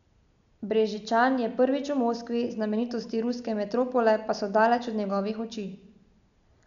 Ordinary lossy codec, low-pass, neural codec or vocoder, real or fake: none; 7.2 kHz; none; real